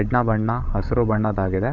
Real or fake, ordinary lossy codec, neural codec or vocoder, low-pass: fake; none; codec, 16 kHz, 16 kbps, FreqCodec, larger model; 7.2 kHz